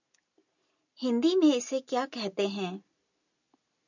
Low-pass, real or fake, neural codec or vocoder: 7.2 kHz; real; none